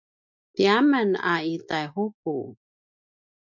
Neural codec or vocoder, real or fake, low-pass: none; real; 7.2 kHz